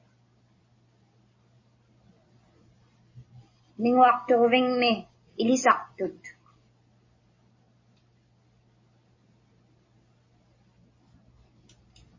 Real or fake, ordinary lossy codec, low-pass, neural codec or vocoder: real; MP3, 32 kbps; 7.2 kHz; none